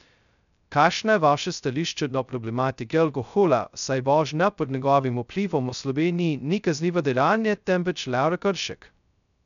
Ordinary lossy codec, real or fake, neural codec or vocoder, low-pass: none; fake; codec, 16 kHz, 0.2 kbps, FocalCodec; 7.2 kHz